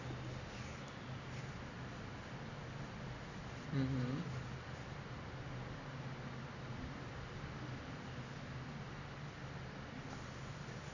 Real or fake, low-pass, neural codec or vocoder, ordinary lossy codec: real; 7.2 kHz; none; none